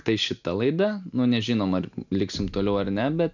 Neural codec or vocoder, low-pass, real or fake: none; 7.2 kHz; real